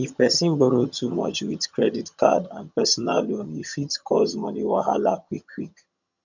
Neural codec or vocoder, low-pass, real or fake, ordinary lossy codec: vocoder, 22.05 kHz, 80 mel bands, HiFi-GAN; 7.2 kHz; fake; none